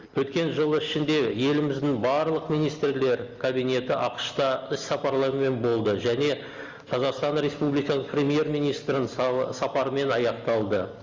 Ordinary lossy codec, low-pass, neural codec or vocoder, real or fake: Opus, 32 kbps; 7.2 kHz; none; real